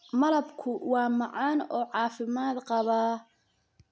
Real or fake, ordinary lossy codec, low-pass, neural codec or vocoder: real; none; none; none